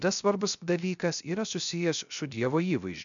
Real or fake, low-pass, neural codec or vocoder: fake; 7.2 kHz; codec, 16 kHz, 0.3 kbps, FocalCodec